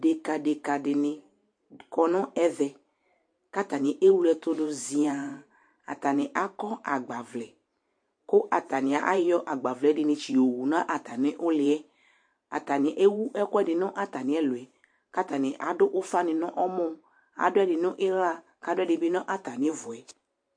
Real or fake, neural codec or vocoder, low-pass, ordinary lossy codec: fake; vocoder, 44.1 kHz, 128 mel bands every 512 samples, BigVGAN v2; 9.9 kHz; MP3, 48 kbps